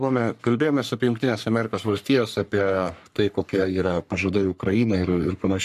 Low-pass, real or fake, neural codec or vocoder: 14.4 kHz; fake; codec, 44.1 kHz, 3.4 kbps, Pupu-Codec